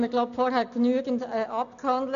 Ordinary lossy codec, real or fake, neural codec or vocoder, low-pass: none; real; none; 7.2 kHz